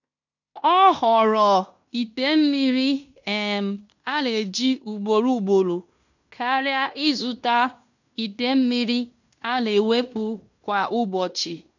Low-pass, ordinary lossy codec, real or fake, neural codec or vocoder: 7.2 kHz; none; fake; codec, 16 kHz in and 24 kHz out, 0.9 kbps, LongCat-Audio-Codec, fine tuned four codebook decoder